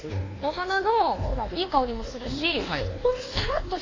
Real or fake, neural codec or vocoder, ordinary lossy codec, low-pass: fake; codec, 24 kHz, 1.2 kbps, DualCodec; AAC, 32 kbps; 7.2 kHz